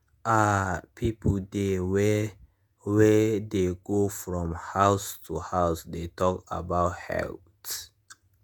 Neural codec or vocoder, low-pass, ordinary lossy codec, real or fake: vocoder, 48 kHz, 128 mel bands, Vocos; none; none; fake